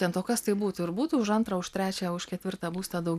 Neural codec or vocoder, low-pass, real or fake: none; 14.4 kHz; real